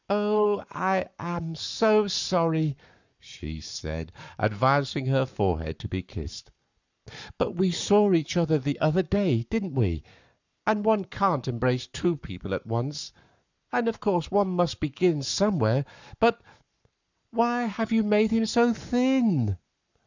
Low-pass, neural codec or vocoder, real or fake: 7.2 kHz; codec, 44.1 kHz, 7.8 kbps, Pupu-Codec; fake